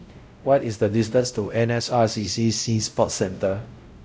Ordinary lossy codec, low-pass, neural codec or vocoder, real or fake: none; none; codec, 16 kHz, 0.5 kbps, X-Codec, WavLM features, trained on Multilingual LibriSpeech; fake